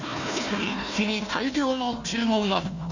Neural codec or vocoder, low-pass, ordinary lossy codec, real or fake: codec, 16 kHz, 1 kbps, FunCodec, trained on Chinese and English, 50 frames a second; 7.2 kHz; none; fake